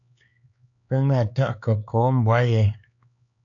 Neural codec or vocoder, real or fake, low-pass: codec, 16 kHz, 4 kbps, X-Codec, HuBERT features, trained on LibriSpeech; fake; 7.2 kHz